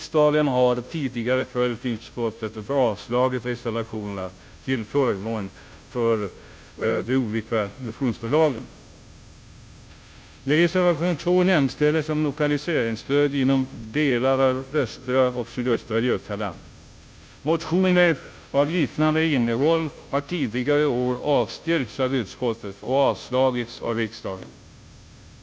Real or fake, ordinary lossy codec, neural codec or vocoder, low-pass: fake; none; codec, 16 kHz, 0.5 kbps, FunCodec, trained on Chinese and English, 25 frames a second; none